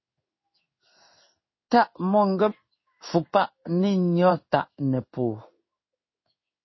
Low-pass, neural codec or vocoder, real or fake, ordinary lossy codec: 7.2 kHz; codec, 16 kHz in and 24 kHz out, 1 kbps, XY-Tokenizer; fake; MP3, 24 kbps